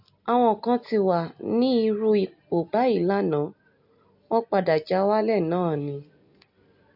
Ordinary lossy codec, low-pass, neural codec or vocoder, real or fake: AAC, 48 kbps; 5.4 kHz; none; real